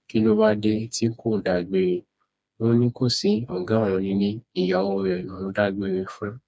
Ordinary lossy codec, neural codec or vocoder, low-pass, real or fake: none; codec, 16 kHz, 2 kbps, FreqCodec, smaller model; none; fake